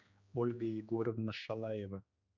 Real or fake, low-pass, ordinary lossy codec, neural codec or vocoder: fake; 7.2 kHz; Opus, 64 kbps; codec, 16 kHz, 2 kbps, X-Codec, HuBERT features, trained on general audio